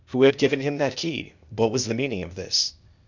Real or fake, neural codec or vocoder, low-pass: fake; codec, 16 kHz, 0.8 kbps, ZipCodec; 7.2 kHz